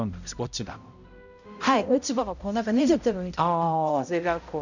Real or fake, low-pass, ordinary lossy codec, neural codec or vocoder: fake; 7.2 kHz; none; codec, 16 kHz, 0.5 kbps, X-Codec, HuBERT features, trained on balanced general audio